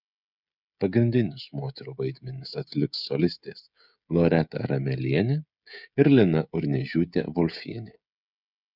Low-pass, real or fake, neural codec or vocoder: 5.4 kHz; fake; codec, 16 kHz, 16 kbps, FreqCodec, smaller model